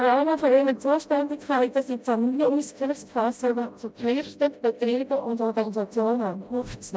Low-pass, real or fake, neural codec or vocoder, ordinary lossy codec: none; fake; codec, 16 kHz, 0.5 kbps, FreqCodec, smaller model; none